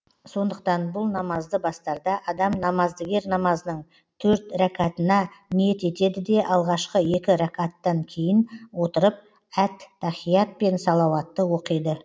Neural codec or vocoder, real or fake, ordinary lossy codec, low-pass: none; real; none; none